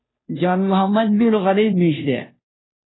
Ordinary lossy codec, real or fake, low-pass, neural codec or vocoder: AAC, 16 kbps; fake; 7.2 kHz; codec, 16 kHz, 0.5 kbps, FunCodec, trained on Chinese and English, 25 frames a second